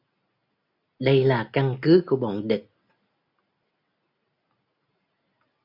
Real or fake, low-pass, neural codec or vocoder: real; 5.4 kHz; none